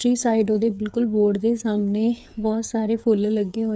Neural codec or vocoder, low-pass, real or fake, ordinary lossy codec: codec, 16 kHz, 8 kbps, FreqCodec, smaller model; none; fake; none